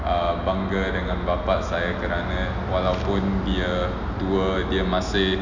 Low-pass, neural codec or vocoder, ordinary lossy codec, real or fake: 7.2 kHz; none; none; real